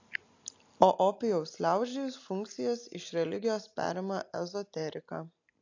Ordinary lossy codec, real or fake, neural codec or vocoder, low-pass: AAC, 48 kbps; real; none; 7.2 kHz